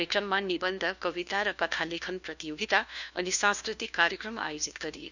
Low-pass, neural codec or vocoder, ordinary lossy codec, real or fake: 7.2 kHz; codec, 16 kHz, 1 kbps, FunCodec, trained on LibriTTS, 50 frames a second; none; fake